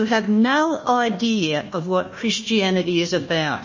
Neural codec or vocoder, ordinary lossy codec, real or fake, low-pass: codec, 16 kHz, 1 kbps, FunCodec, trained on Chinese and English, 50 frames a second; MP3, 32 kbps; fake; 7.2 kHz